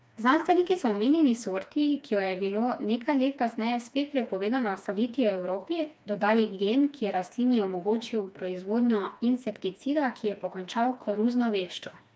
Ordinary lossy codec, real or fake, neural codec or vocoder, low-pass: none; fake; codec, 16 kHz, 2 kbps, FreqCodec, smaller model; none